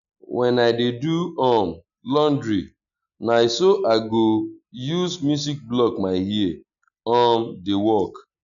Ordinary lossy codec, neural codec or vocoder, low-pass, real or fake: none; none; 7.2 kHz; real